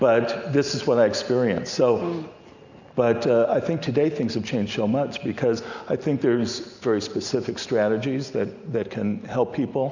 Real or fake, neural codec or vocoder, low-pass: real; none; 7.2 kHz